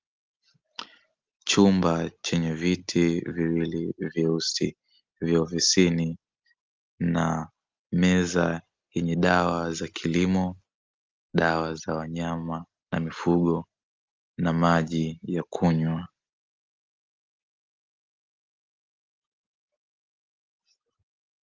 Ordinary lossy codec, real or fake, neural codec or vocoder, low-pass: Opus, 32 kbps; real; none; 7.2 kHz